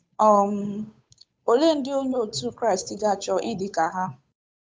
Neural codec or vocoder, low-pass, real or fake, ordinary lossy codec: codec, 16 kHz, 8 kbps, FunCodec, trained on Chinese and English, 25 frames a second; none; fake; none